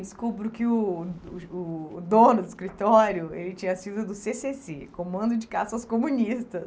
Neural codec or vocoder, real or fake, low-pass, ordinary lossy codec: none; real; none; none